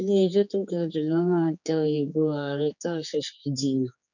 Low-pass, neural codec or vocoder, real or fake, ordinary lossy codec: 7.2 kHz; codec, 16 kHz, 4 kbps, X-Codec, HuBERT features, trained on general audio; fake; none